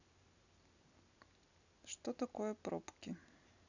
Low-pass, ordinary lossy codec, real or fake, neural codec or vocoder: 7.2 kHz; none; real; none